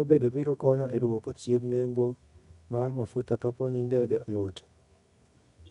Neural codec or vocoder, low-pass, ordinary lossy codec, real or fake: codec, 24 kHz, 0.9 kbps, WavTokenizer, medium music audio release; 10.8 kHz; none; fake